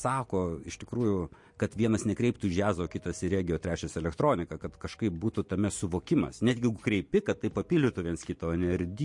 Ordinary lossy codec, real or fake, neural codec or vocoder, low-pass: MP3, 48 kbps; real; none; 10.8 kHz